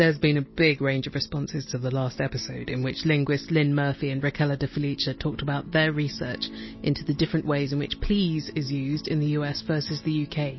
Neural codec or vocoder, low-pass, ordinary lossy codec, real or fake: none; 7.2 kHz; MP3, 24 kbps; real